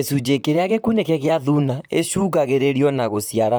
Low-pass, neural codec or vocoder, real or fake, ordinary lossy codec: none; vocoder, 44.1 kHz, 128 mel bands, Pupu-Vocoder; fake; none